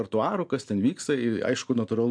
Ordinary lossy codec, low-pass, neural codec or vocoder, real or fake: MP3, 64 kbps; 9.9 kHz; none; real